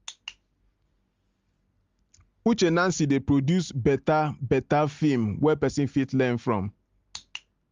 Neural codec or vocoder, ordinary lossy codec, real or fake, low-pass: none; Opus, 32 kbps; real; 7.2 kHz